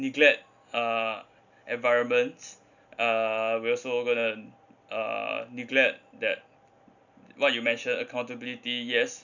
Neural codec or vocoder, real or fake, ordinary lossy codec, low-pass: none; real; none; 7.2 kHz